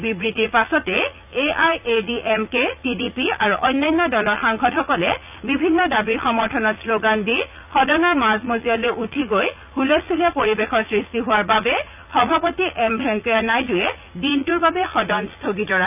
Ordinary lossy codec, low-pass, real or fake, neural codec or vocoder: none; 3.6 kHz; fake; vocoder, 44.1 kHz, 80 mel bands, Vocos